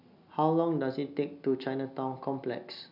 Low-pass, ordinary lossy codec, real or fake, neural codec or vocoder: 5.4 kHz; none; real; none